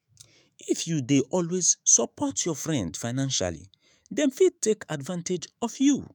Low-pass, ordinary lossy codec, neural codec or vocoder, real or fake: none; none; autoencoder, 48 kHz, 128 numbers a frame, DAC-VAE, trained on Japanese speech; fake